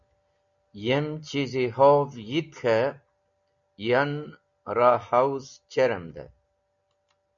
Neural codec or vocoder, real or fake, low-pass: none; real; 7.2 kHz